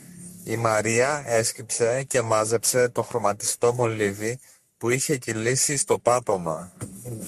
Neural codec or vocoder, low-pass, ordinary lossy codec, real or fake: codec, 44.1 kHz, 3.4 kbps, Pupu-Codec; 14.4 kHz; MP3, 64 kbps; fake